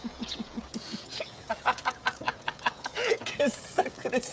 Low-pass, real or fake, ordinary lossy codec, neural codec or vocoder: none; fake; none; codec, 16 kHz, 16 kbps, FreqCodec, smaller model